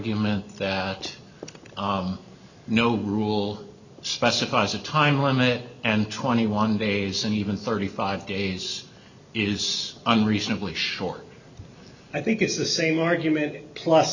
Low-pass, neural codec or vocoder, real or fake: 7.2 kHz; none; real